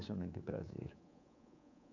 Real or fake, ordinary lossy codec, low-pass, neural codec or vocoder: fake; none; 7.2 kHz; codec, 24 kHz, 3.1 kbps, DualCodec